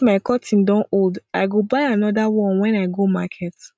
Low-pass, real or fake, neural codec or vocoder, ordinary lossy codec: none; real; none; none